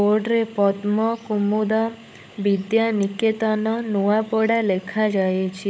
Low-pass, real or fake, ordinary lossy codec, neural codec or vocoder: none; fake; none; codec, 16 kHz, 16 kbps, FunCodec, trained on LibriTTS, 50 frames a second